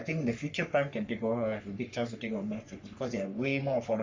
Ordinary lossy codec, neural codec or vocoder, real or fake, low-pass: MP3, 48 kbps; codec, 44.1 kHz, 3.4 kbps, Pupu-Codec; fake; 7.2 kHz